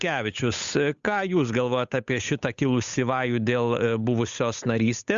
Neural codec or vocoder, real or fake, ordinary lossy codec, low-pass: codec, 16 kHz, 8 kbps, FunCodec, trained on Chinese and English, 25 frames a second; fake; Opus, 64 kbps; 7.2 kHz